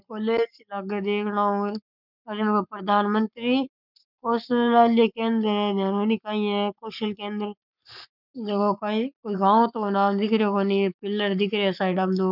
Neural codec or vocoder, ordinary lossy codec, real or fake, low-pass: codec, 44.1 kHz, 7.8 kbps, Pupu-Codec; none; fake; 5.4 kHz